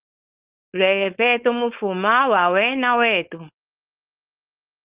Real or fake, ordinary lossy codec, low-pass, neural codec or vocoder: fake; Opus, 32 kbps; 3.6 kHz; codec, 16 kHz, 4.8 kbps, FACodec